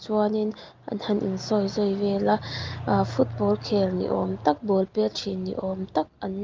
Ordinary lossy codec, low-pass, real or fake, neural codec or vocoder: Opus, 32 kbps; 7.2 kHz; real; none